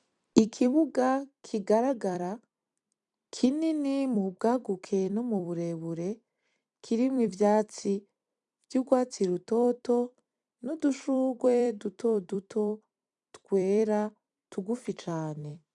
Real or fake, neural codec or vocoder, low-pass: fake; vocoder, 44.1 kHz, 128 mel bands every 256 samples, BigVGAN v2; 10.8 kHz